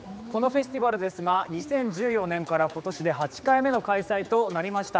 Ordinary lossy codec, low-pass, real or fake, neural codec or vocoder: none; none; fake; codec, 16 kHz, 4 kbps, X-Codec, HuBERT features, trained on general audio